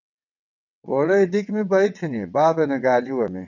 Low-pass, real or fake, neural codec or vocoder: 7.2 kHz; fake; vocoder, 22.05 kHz, 80 mel bands, WaveNeXt